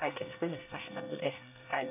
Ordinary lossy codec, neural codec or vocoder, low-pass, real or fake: none; codec, 24 kHz, 1 kbps, SNAC; 3.6 kHz; fake